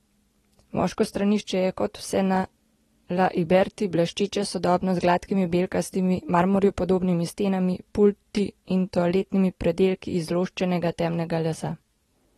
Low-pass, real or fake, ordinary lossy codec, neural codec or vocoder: 19.8 kHz; real; AAC, 32 kbps; none